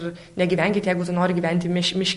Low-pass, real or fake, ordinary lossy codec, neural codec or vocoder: 14.4 kHz; real; MP3, 48 kbps; none